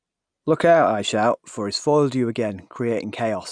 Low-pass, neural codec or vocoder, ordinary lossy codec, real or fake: 9.9 kHz; none; none; real